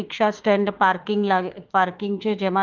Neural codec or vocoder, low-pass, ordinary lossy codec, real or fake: autoencoder, 48 kHz, 32 numbers a frame, DAC-VAE, trained on Japanese speech; 7.2 kHz; Opus, 32 kbps; fake